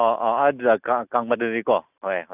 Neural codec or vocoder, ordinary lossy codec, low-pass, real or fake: none; none; 3.6 kHz; real